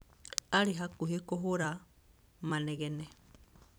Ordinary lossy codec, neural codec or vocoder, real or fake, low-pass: none; vocoder, 44.1 kHz, 128 mel bands every 256 samples, BigVGAN v2; fake; none